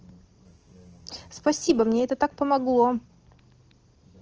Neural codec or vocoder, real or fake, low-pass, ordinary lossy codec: none; real; 7.2 kHz; Opus, 16 kbps